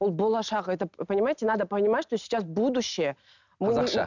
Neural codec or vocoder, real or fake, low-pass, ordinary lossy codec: none; real; 7.2 kHz; none